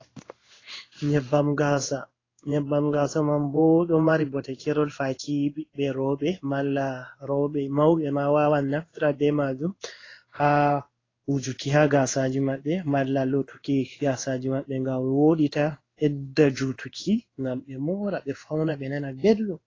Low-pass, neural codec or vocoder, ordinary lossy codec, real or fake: 7.2 kHz; codec, 16 kHz in and 24 kHz out, 1 kbps, XY-Tokenizer; AAC, 32 kbps; fake